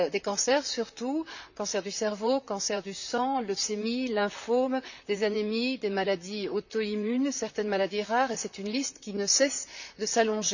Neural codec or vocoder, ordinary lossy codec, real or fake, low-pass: vocoder, 44.1 kHz, 128 mel bands, Pupu-Vocoder; none; fake; 7.2 kHz